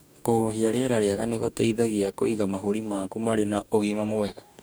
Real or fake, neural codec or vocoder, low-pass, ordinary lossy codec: fake; codec, 44.1 kHz, 2.6 kbps, DAC; none; none